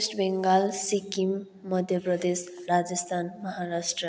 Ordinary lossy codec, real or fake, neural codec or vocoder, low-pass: none; real; none; none